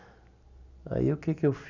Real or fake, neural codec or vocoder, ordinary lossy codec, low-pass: real; none; none; 7.2 kHz